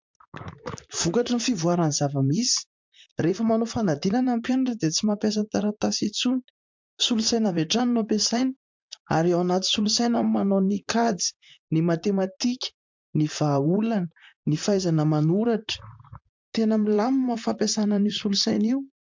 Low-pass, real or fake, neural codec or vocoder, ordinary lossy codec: 7.2 kHz; fake; vocoder, 44.1 kHz, 128 mel bands, Pupu-Vocoder; MP3, 64 kbps